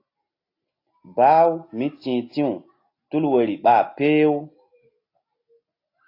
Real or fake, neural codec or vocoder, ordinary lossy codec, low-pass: real; none; AAC, 32 kbps; 5.4 kHz